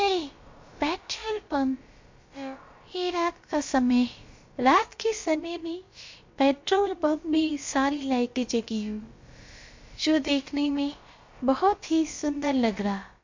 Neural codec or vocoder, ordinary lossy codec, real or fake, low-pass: codec, 16 kHz, about 1 kbps, DyCAST, with the encoder's durations; MP3, 48 kbps; fake; 7.2 kHz